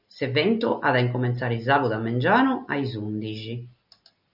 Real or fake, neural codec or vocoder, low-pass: real; none; 5.4 kHz